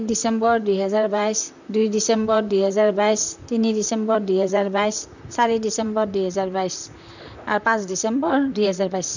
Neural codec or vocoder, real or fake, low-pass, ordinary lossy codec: vocoder, 44.1 kHz, 128 mel bands, Pupu-Vocoder; fake; 7.2 kHz; none